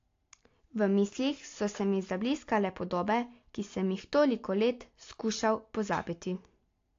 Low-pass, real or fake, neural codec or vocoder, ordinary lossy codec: 7.2 kHz; real; none; AAC, 48 kbps